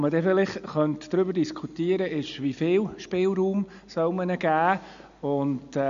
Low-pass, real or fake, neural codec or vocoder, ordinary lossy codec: 7.2 kHz; real; none; AAC, 96 kbps